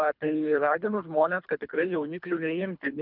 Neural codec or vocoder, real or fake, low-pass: codec, 24 kHz, 3 kbps, HILCodec; fake; 5.4 kHz